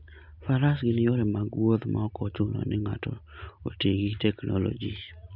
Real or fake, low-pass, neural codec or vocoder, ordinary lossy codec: real; 5.4 kHz; none; none